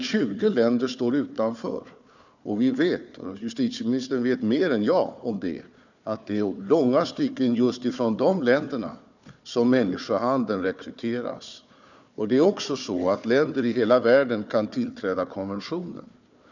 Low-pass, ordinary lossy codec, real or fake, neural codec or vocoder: 7.2 kHz; none; fake; codec, 16 kHz, 4 kbps, FunCodec, trained on Chinese and English, 50 frames a second